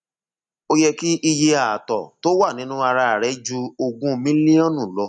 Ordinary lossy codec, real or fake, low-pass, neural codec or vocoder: none; real; 9.9 kHz; none